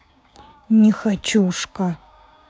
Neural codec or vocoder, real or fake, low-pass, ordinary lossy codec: codec, 16 kHz, 6 kbps, DAC; fake; none; none